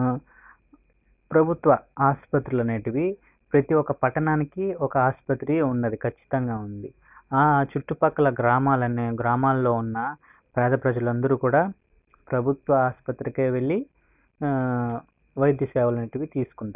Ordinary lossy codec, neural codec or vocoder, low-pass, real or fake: none; none; 3.6 kHz; real